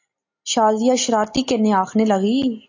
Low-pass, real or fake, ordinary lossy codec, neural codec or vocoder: 7.2 kHz; real; AAC, 48 kbps; none